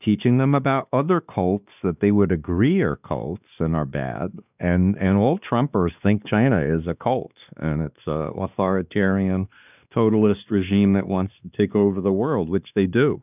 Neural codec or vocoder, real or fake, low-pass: codec, 16 kHz, 2 kbps, X-Codec, WavLM features, trained on Multilingual LibriSpeech; fake; 3.6 kHz